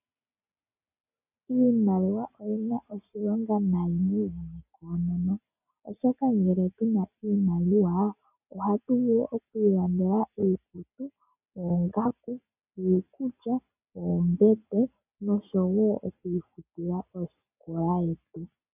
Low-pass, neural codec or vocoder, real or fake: 3.6 kHz; none; real